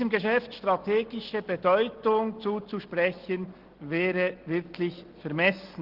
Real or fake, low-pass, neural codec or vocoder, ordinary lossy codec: real; 5.4 kHz; none; Opus, 16 kbps